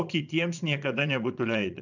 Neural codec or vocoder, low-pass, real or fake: vocoder, 22.05 kHz, 80 mel bands, Vocos; 7.2 kHz; fake